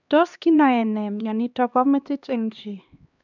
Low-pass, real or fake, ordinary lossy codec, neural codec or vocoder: 7.2 kHz; fake; none; codec, 16 kHz, 2 kbps, X-Codec, HuBERT features, trained on LibriSpeech